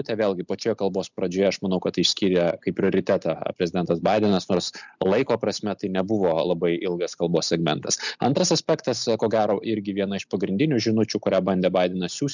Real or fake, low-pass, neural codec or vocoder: real; 7.2 kHz; none